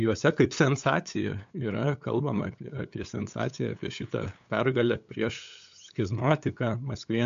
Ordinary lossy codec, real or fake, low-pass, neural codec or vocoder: AAC, 64 kbps; fake; 7.2 kHz; codec, 16 kHz, 8 kbps, FunCodec, trained on LibriTTS, 25 frames a second